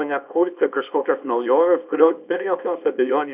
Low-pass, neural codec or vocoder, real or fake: 3.6 kHz; codec, 24 kHz, 0.9 kbps, WavTokenizer, small release; fake